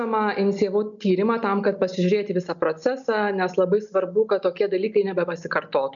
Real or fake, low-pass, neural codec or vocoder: real; 7.2 kHz; none